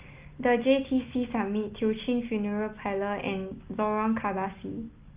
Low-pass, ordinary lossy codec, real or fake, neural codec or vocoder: 3.6 kHz; Opus, 32 kbps; real; none